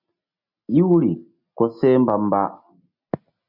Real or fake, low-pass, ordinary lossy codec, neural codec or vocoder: real; 5.4 kHz; MP3, 48 kbps; none